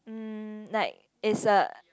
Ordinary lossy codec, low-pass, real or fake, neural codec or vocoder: none; none; real; none